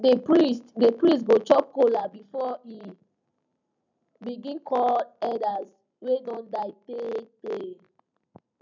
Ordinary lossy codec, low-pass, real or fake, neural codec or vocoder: none; 7.2 kHz; real; none